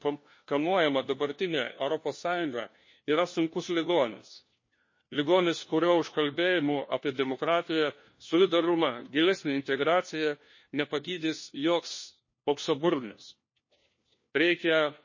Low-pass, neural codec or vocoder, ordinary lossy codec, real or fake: 7.2 kHz; codec, 16 kHz, 1 kbps, FunCodec, trained on LibriTTS, 50 frames a second; MP3, 32 kbps; fake